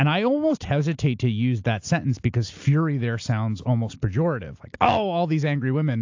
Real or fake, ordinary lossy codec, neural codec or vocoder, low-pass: real; AAC, 48 kbps; none; 7.2 kHz